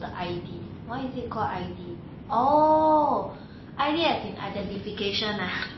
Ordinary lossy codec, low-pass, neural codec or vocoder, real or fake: MP3, 24 kbps; 7.2 kHz; none; real